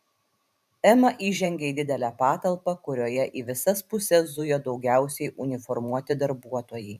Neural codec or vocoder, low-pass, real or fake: vocoder, 44.1 kHz, 128 mel bands every 512 samples, BigVGAN v2; 14.4 kHz; fake